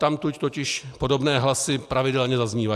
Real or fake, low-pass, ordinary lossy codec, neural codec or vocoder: real; 14.4 kHz; AAC, 96 kbps; none